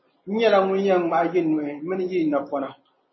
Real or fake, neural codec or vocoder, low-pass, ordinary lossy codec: real; none; 7.2 kHz; MP3, 24 kbps